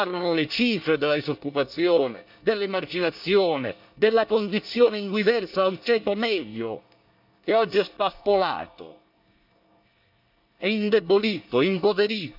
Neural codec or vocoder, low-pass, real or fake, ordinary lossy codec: codec, 24 kHz, 1 kbps, SNAC; 5.4 kHz; fake; none